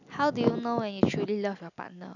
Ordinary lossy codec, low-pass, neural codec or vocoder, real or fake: none; 7.2 kHz; none; real